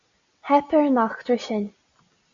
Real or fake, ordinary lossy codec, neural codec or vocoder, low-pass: real; Opus, 64 kbps; none; 7.2 kHz